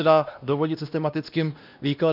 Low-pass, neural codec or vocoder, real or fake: 5.4 kHz; codec, 16 kHz, 1 kbps, X-Codec, WavLM features, trained on Multilingual LibriSpeech; fake